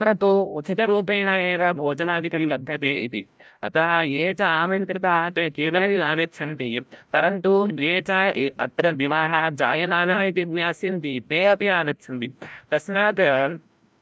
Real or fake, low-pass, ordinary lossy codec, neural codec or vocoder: fake; none; none; codec, 16 kHz, 0.5 kbps, FreqCodec, larger model